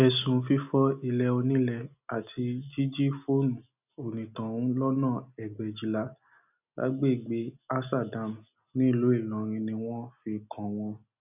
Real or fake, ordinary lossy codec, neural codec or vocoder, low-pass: real; none; none; 3.6 kHz